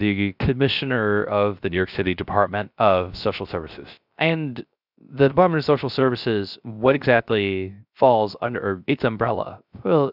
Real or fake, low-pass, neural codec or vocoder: fake; 5.4 kHz; codec, 16 kHz, 0.3 kbps, FocalCodec